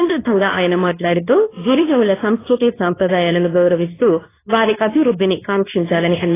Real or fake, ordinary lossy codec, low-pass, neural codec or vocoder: fake; AAC, 16 kbps; 3.6 kHz; codec, 24 kHz, 0.9 kbps, WavTokenizer, medium speech release version 2